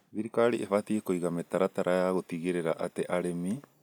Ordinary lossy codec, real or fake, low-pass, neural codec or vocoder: none; real; none; none